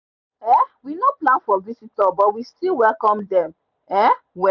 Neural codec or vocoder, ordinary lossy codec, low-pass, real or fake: none; none; 7.2 kHz; real